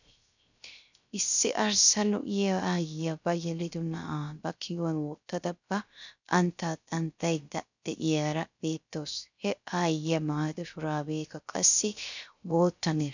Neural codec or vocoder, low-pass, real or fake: codec, 16 kHz, 0.3 kbps, FocalCodec; 7.2 kHz; fake